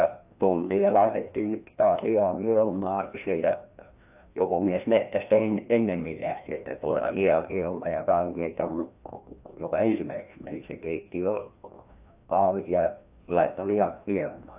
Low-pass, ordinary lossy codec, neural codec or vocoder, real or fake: 3.6 kHz; AAC, 32 kbps; codec, 16 kHz, 1 kbps, FreqCodec, larger model; fake